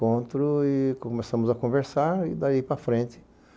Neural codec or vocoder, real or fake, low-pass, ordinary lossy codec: none; real; none; none